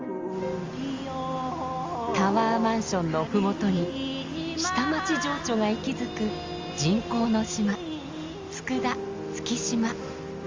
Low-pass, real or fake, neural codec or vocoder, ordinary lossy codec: 7.2 kHz; real; none; Opus, 32 kbps